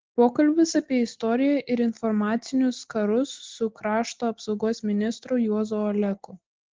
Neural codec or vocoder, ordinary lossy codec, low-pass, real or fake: none; Opus, 16 kbps; 7.2 kHz; real